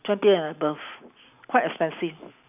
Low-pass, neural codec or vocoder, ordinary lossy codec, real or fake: 3.6 kHz; none; none; real